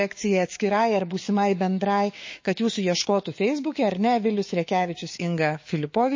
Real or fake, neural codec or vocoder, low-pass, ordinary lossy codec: fake; codec, 16 kHz, 6 kbps, DAC; 7.2 kHz; MP3, 32 kbps